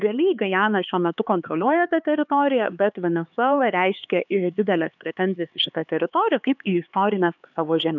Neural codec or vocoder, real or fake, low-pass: codec, 16 kHz, 4 kbps, X-Codec, HuBERT features, trained on LibriSpeech; fake; 7.2 kHz